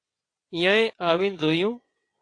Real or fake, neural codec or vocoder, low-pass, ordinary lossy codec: fake; vocoder, 22.05 kHz, 80 mel bands, WaveNeXt; 9.9 kHz; AAC, 48 kbps